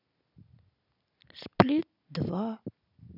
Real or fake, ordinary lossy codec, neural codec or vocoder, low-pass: real; none; none; 5.4 kHz